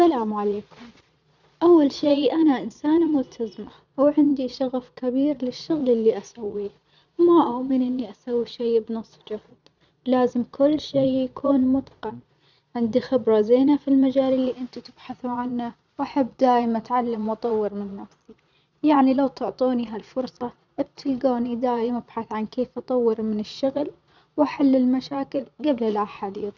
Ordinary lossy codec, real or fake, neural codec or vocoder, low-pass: none; fake; vocoder, 22.05 kHz, 80 mel bands, Vocos; 7.2 kHz